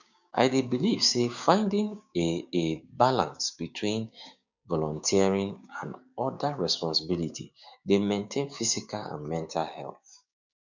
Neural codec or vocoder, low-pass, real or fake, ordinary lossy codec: codec, 16 kHz, 6 kbps, DAC; 7.2 kHz; fake; none